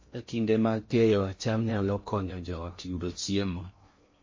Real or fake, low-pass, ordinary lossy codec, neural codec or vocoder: fake; 7.2 kHz; MP3, 32 kbps; codec, 16 kHz in and 24 kHz out, 0.6 kbps, FocalCodec, streaming, 2048 codes